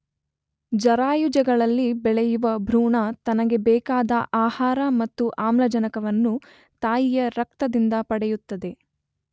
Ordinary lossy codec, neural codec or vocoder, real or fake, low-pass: none; none; real; none